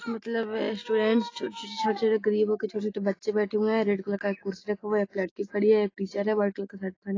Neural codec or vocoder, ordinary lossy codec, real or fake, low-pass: none; AAC, 32 kbps; real; 7.2 kHz